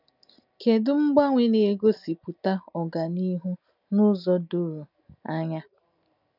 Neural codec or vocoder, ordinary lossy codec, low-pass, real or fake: none; none; 5.4 kHz; real